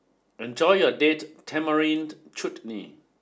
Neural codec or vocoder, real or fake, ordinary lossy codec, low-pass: none; real; none; none